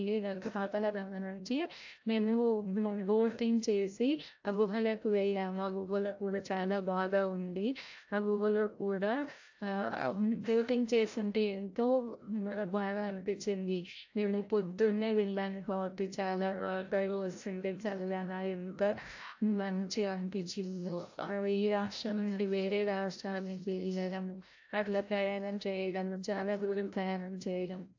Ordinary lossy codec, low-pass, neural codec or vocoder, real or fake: AAC, 48 kbps; 7.2 kHz; codec, 16 kHz, 0.5 kbps, FreqCodec, larger model; fake